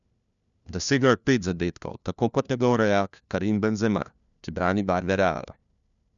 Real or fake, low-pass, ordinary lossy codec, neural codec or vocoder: fake; 7.2 kHz; none; codec, 16 kHz, 1 kbps, FunCodec, trained on LibriTTS, 50 frames a second